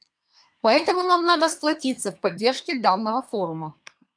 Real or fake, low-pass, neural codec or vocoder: fake; 9.9 kHz; codec, 24 kHz, 1 kbps, SNAC